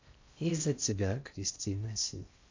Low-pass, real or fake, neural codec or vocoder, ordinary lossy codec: 7.2 kHz; fake; codec, 16 kHz in and 24 kHz out, 0.6 kbps, FocalCodec, streaming, 4096 codes; MP3, 64 kbps